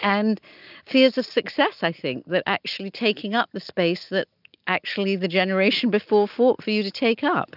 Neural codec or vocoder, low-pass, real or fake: none; 5.4 kHz; real